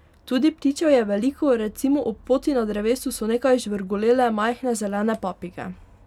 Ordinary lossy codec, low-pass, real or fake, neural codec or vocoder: none; 19.8 kHz; real; none